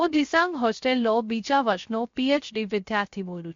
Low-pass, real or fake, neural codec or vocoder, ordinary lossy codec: 7.2 kHz; fake; codec, 16 kHz, 0.3 kbps, FocalCodec; MP3, 48 kbps